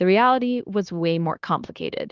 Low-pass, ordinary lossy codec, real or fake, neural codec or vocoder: 7.2 kHz; Opus, 32 kbps; fake; codec, 16 kHz, 0.9 kbps, LongCat-Audio-Codec